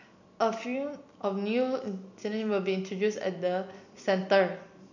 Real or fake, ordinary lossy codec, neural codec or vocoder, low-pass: real; none; none; 7.2 kHz